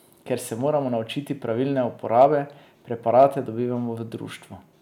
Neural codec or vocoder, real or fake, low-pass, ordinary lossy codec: none; real; 19.8 kHz; none